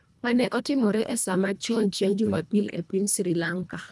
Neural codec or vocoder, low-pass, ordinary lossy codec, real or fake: codec, 24 kHz, 1.5 kbps, HILCodec; none; none; fake